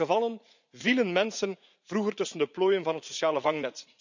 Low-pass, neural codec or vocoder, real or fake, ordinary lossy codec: 7.2 kHz; vocoder, 44.1 kHz, 80 mel bands, Vocos; fake; none